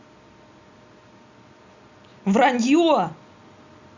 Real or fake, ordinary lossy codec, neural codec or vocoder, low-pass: real; Opus, 64 kbps; none; 7.2 kHz